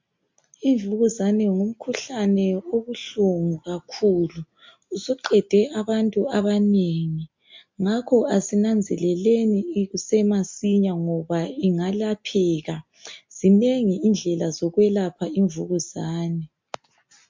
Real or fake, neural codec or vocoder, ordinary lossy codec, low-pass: real; none; MP3, 48 kbps; 7.2 kHz